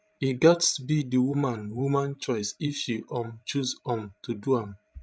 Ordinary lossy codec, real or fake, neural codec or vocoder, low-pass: none; fake; codec, 16 kHz, 16 kbps, FreqCodec, larger model; none